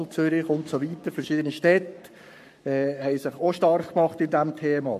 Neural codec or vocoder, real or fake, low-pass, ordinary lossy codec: codec, 44.1 kHz, 7.8 kbps, Pupu-Codec; fake; 14.4 kHz; MP3, 64 kbps